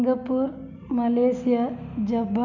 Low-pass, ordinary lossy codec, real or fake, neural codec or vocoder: 7.2 kHz; none; real; none